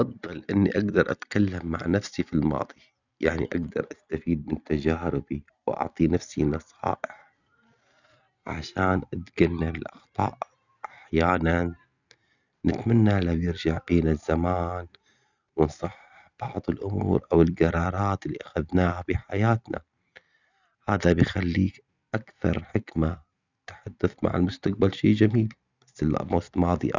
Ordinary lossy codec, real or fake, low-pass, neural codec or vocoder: none; real; 7.2 kHz; none